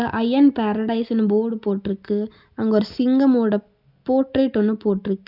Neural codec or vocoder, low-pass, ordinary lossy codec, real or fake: none; 5.4 kHz; none; real